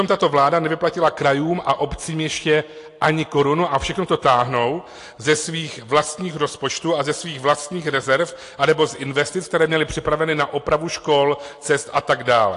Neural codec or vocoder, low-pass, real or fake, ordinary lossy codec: none; 10.8 kHz; real; AAC, 48 kbps